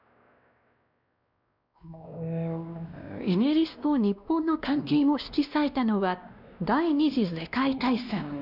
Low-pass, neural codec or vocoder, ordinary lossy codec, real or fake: 5.4 kHz; codec, 16 kHz, 1 kbps, X-Codec, WavLM features, trained on Multilingual LibriSpeech; none; fake